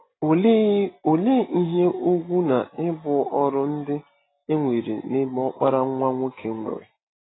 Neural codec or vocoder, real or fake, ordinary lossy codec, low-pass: none; real; AAC, 16 kbps; 7.2 kHz